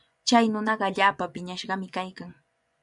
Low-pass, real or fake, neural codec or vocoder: 10.8 kHz; fake; vocoder, 24 kHz, 100 mel bands, Vocos